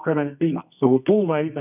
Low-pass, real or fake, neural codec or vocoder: 3.6 kHz; fake; codec, 24 kHz, 0.9 kbps, WavTokenizer, medium music audio release